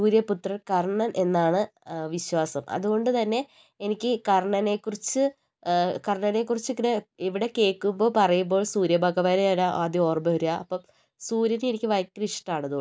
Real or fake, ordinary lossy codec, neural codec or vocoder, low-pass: real; none; none; none